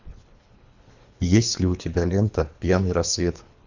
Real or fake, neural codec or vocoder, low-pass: fake; codec, 24 kHz, 3 kbps, HILCodec; 7.2 kHz